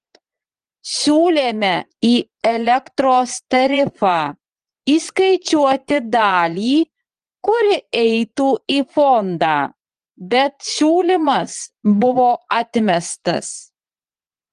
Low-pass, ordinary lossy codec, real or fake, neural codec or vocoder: 9.9 kHz; Opus, 24 kbps; fake; vocoder, 22.05 kHz, 80 mel bands, Vocos